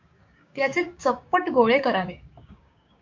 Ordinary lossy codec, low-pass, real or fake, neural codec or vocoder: MP3, 48 kbps; 7.2 kHz; fake; codec, 16 kHz, 6 kbps, DAC